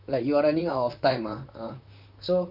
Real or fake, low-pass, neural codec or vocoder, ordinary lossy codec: fake; 5.4 kHz; vocoder, 44.1 kHz, 128 mel bands, Pupu-Vocoder; none